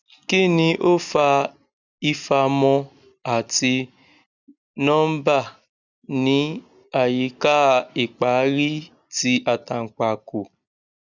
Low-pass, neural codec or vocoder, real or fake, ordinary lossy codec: 7.2 kHz; none; real; none